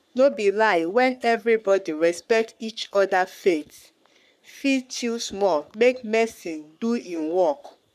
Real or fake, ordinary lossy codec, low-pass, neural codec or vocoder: fake; none; 14.4 kHz; codec, 44.1 kHz, 3.4 kbps, Pupu-Codec